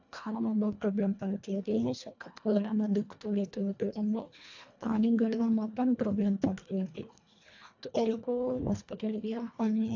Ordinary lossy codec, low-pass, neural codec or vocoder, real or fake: AAC, 48 kbps; 7.2 kHz; codec, 24 kHz, 1.5 kbps, HILCodec; fake